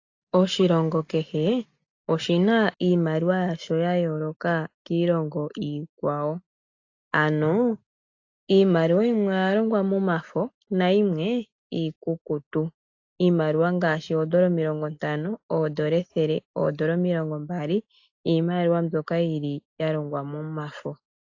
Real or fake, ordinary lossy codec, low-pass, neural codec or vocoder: real; AAC, 48 kbps; 7.2 kHz; none